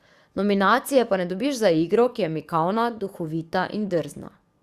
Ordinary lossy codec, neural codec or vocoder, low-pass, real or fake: Opus, 64 kbps; codec, 44.1 kHz, 7.8 kbps, DAC; 14.4 kHz; fake